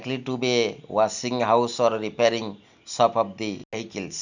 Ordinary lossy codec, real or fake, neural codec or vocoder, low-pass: none; real; none; 7.2 kHz